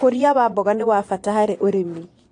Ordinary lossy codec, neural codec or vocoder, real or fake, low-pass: AAC, 48 kbps; vocoder, 22.05 kHz, 80 mel bands, Vocos; fake; 9.9 kHz